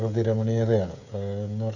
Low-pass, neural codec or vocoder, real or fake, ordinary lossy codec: 7.2 kHz; codec, 16 kHz, 16 kbps, FreqCodec, smaller model; fake; none